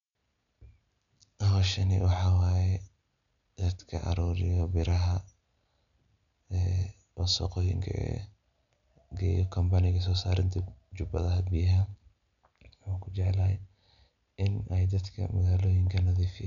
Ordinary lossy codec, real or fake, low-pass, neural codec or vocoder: none; real; 7.2 kHz; none